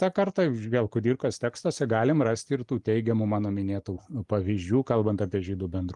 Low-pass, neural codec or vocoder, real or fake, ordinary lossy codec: 10.8 kHz; none; real; Opus, 32 kbps